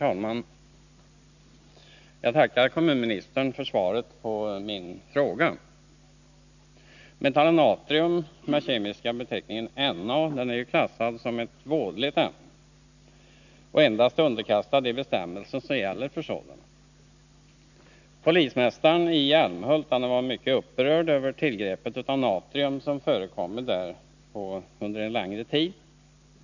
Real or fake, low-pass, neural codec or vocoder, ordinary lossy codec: real; 7.2 kHz; none; none